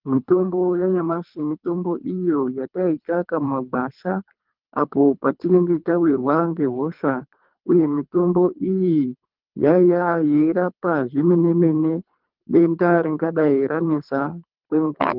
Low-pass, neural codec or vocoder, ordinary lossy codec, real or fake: 5.4 kHz; codec, 24 kHz, 3 kbps, HILCodec; Opus, 24 kbps; fake